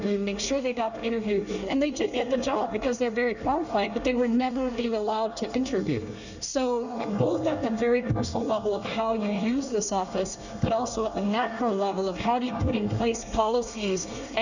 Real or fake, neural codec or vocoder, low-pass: fake; codec, 24 kHz, 1 kbps, SNAC; 7.2 kHz